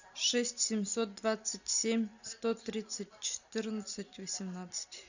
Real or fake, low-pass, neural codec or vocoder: real; 7.2 kHz; none